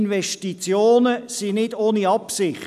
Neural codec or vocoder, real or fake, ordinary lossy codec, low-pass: none; real; none; 14.4 kHz